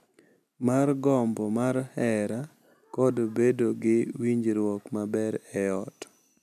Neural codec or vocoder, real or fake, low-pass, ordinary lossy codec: none; real; 14.4 kHz; none